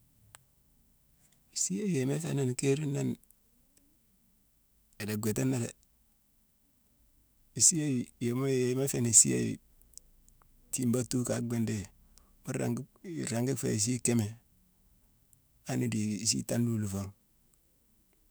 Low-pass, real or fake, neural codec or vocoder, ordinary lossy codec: none; fake; autoencoder, 48 kHz, 128 numbers a frame, DAC-VAE, trained on Japanese speech; none